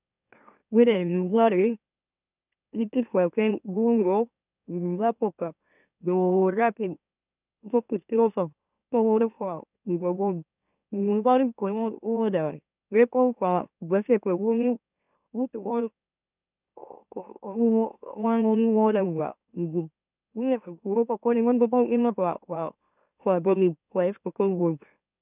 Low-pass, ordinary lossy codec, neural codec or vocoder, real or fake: 3.6 kHz; none; autoencoder, 44.1 kHz, a latent of 192 numbers a frame, MeloTTS; fake